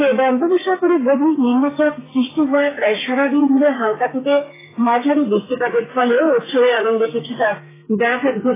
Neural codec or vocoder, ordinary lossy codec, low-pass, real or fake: codec, 32 kHz, 1.9 kbps, SNAC; AAC, 16 kbps; 3.6 kHz; fake